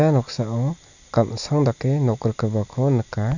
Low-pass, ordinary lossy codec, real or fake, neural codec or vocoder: 7.2 kHz; none; real; none